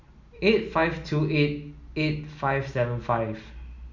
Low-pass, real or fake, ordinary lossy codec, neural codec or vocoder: 7.2 kHz; real; none; none